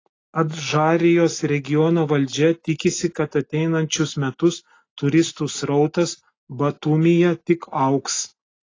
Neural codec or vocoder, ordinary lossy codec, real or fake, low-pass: none; AAC, 32 kbps; real; 7.2 kHz